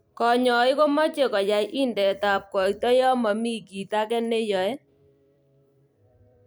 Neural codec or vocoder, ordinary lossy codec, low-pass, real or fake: vocoder, 44.1 kHz, 128 mel bands every 256 samples, BigVGAN v2; none; none; fake